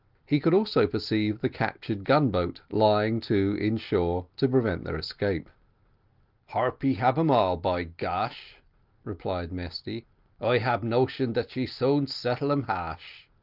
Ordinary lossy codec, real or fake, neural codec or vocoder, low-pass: Opus, 24 kbps; real; none; 5.4 kHz